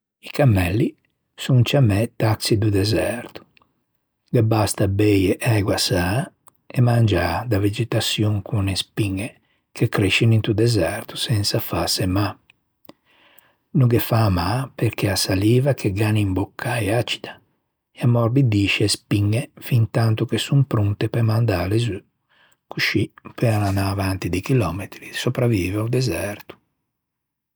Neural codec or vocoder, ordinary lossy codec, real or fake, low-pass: none; none; real; none